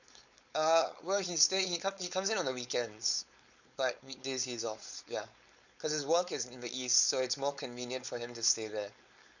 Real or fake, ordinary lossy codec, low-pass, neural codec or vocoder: fake; none; 7.2 kHz; codec, 16 kHz, 4.8 kbps, FACodec